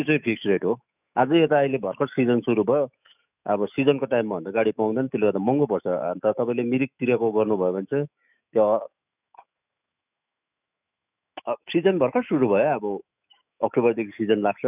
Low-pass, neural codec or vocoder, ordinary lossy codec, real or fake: 3.6 kHz; autoencoder, 48 kHz, 128 numbers a frame, DAC-VAE, trained on Japanese speech; none; fake